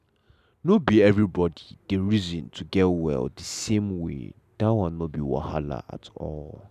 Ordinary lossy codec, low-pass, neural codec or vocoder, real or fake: none; 14.4 kHz; none; real